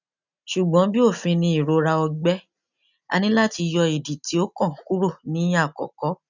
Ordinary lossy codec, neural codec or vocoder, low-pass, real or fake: none; none; 7.2 kHz; real